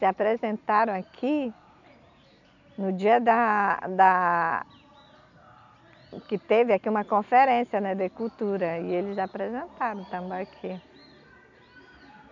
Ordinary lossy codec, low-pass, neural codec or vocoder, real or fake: none; 7.2 kHz; none; real